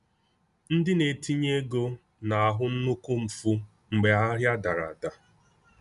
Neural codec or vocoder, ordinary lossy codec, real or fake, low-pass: none; none; real; 10.8 kHz